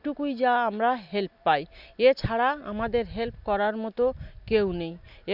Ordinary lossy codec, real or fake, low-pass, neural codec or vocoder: none; real; 5.4 kHz; none